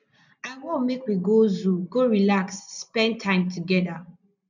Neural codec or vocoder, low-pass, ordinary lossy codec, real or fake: none; 7.2 kHz; none; real